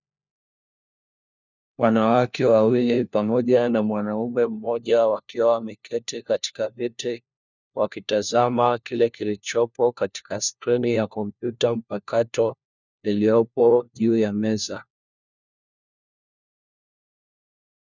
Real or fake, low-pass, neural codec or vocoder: fake; 7.2 kHz; codec, 16 kHz, 1 kbps, FunCodec, trained on LibriTTS, 50 frames a second